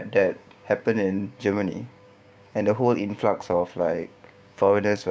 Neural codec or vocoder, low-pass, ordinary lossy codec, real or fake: codec, 16 kHz, 6 kbps, DAC; none; none; fake